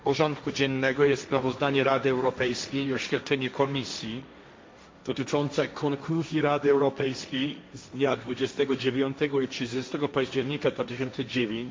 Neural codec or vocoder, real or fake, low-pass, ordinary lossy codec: codec, 16 kHz, 1.1 kbps, Voila-Tokenizer; fake; none; none